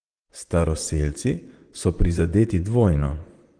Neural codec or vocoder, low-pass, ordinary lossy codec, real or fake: vocoder, 44.1 kHz, 128 mel bands, Pupu-Vocoder; 9.9 kHz; Opus, 24 kbps; fake